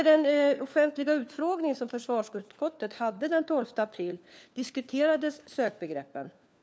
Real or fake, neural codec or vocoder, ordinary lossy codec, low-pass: fake; codec, 16 kHz, 4 kbps, FunCodec, trained on LibriTTS, 50 frames a second; none; none